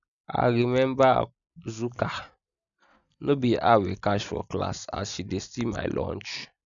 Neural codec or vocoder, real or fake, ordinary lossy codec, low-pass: none; real; none; 7.2 kHz